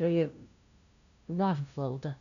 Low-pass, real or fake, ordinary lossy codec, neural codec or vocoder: 7.2 kHz; fake; none; codec, 16 kHz, 0.5 kbps, FunCodec, trained on LibriTTS, 25 frames a second